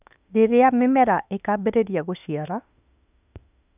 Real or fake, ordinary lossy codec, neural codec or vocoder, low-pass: fake; none; autoencoder, 48 kHz, 32 numbers a frame, DAC-VAE, trained on Japanese speech; 3.6 kHz